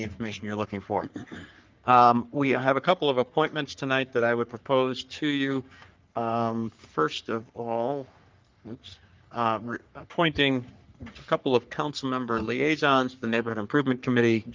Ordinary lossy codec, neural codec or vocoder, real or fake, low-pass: Opus, 24 kbps; codec, 44.1 kHz, 3.4 kbps, Pupu-Codec; fake; 7.2 kHz